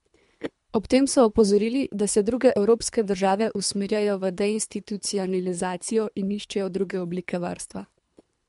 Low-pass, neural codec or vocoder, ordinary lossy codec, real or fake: 10.8 kHz; codec, 24 kHz, 3 kbps, HILCodec; MP3, 64 kbps; fake